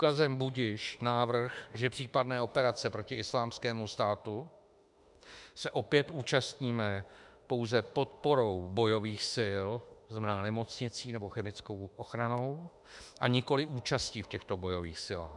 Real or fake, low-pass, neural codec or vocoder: fake; 10.8 kHz; autoencoder, 48 kHz, 32 numbers a frame, DAC-VAE, trained on Japanese speech